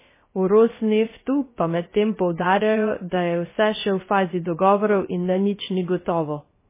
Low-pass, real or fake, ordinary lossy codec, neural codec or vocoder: 3.6 kHz; fake; MP3, 16 kbps; codec, 16 kHz, 0.3 kbps, FocalCodec